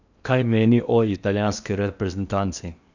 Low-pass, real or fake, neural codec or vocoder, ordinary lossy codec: 7.2 kHz; fake; codec, 16 kHz in and 24 kHz out, 0.8 kbps, FocalCodec, streaming, 65536 codes; none